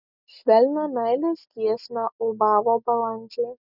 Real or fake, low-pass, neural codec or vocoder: real; 5.4 kHz; none